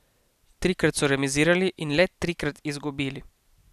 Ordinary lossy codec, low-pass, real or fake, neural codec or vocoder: none; 14.4 kHz; real; none